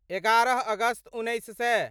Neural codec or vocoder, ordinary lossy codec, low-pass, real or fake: none; none; 19.8 kHz; real